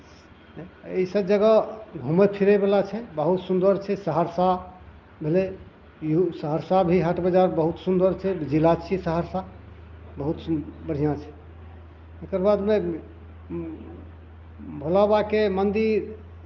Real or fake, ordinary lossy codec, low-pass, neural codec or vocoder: real; Opus, 32 kbps; 7.2 kHz; none